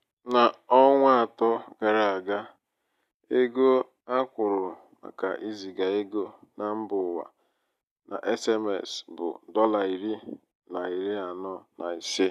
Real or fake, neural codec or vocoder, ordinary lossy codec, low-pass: real; none; none; 14.4 kHz